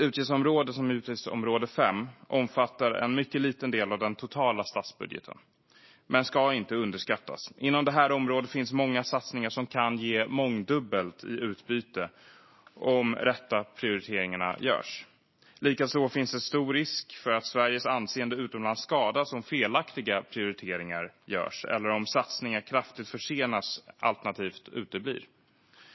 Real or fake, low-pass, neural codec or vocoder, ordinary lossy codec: real; 7.2 kHz; none; MP3, 24 kbps